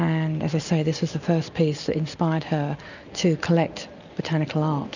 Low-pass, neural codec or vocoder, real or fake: 7.2 kHz; none; real